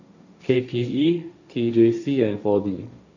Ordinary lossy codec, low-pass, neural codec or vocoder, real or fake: none; 7.2 kHz; codec, 16 kHz, 1.1 kbps, Voila-Tokenizer; fake